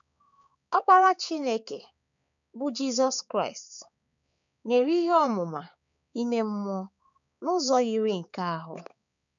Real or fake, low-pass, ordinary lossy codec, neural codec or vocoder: fake; 7.2 kHz; none; codec, 16 kHz, 4 kbps, X-Codec, HuBERT features, trained on balanced general audio